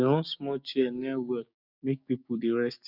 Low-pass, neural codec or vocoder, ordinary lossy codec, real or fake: 5.4 kHz; codec, 16 kHz, 6 kbps, DAC; none; fake